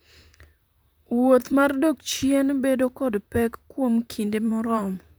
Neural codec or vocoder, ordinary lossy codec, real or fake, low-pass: vocoder, 44.1 kHz, 128 mel bands, Pupu-Vocoder; none; fake; none